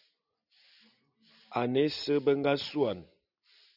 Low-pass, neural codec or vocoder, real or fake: 5.4 kHz; none; real